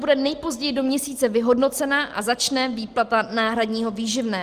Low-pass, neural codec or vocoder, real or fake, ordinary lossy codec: 14.4 kHz; none; real; Opus, 24 kbps